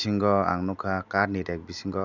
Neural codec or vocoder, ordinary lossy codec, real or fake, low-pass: none; none; real; 7.2 kHz